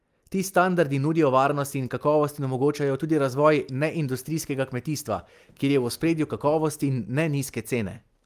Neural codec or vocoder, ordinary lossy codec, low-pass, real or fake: none; Opus, 32 kbps; 14.4 kHz; real